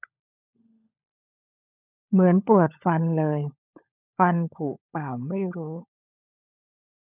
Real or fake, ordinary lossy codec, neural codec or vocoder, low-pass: fake; none; codec, 16 kHz, 16 kbps, FunCodec, trained on LibriTTS, 50 frames a second; 3.6 kHz